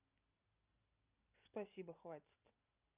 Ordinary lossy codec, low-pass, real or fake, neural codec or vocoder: Opus, 64 kbps; 3.6 kHz; real; none